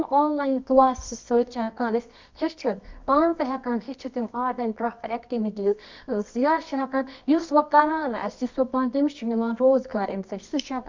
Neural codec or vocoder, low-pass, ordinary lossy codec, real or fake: codec, 24 kHz, 0.9 kbps, WavTokenizer, medium music audio release; 7.2 kHz; MP3, 64 kbps; fake